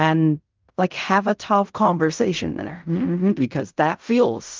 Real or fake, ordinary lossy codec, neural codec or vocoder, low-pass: fake; Opus, 24 kbps; codec, 16 kHz in and 24 kHz out, 0.4 kbps, LongCat-Audio-Codec, fine tuned four codebook decoder; 7.2 kHz